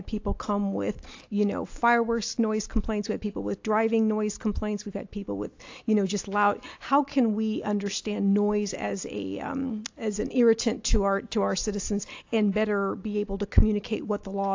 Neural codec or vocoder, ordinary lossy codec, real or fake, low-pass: none; AAC, 48 kbps; real; 7.2 kHz